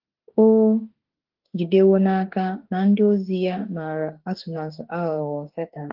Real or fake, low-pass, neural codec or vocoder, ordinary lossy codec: fake; 5.4 kHz; autoencoder, 48 kHz, 32 numbers a frame, DAC-VAE, trained on Japanese speech; Opus, 16 kbps